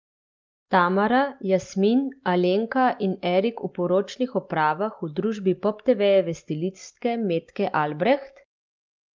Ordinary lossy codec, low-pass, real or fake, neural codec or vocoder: Opus, 24 kbps; 7.2 kHz; real; none